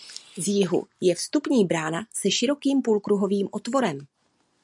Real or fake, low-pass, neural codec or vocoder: real; 10.8 kHz; none